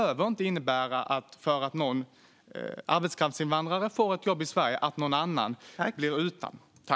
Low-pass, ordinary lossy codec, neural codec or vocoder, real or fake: none; none; none; real